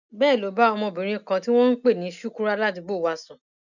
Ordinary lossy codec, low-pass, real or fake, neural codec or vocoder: none; 7.2 kHz; real; none